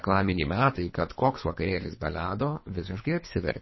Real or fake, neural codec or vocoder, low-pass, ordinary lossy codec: fake; codec, 24 kHz, 3 kbps, HILCodec; 7.2 kHz; MP3, 24 kbps